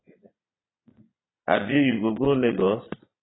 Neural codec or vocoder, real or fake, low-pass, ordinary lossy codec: vocoder, 22.05 kHz, 80 mel bands, Vocos; fake; 7.2 kHz; AAC, 16 kbps